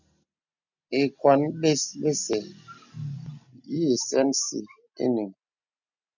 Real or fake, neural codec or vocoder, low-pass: real; none; 7.2 kHz